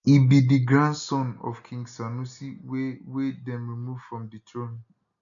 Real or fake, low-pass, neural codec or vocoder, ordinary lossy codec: real; 7.2 kHz; none; none